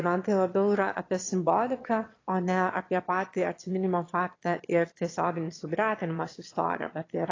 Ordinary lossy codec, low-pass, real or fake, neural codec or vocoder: AAC, 32 kbps; 7.2 kHz; fake; autoencoder, 22.05 kHz, a latent of 192 numbers a frame, VITS, trained on one speaker